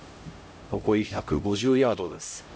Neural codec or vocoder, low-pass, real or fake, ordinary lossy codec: codec, 16 kHz, 0.5 kbps, X-Codec, HuBERT features, trained on LibriSpeech; none; fake; none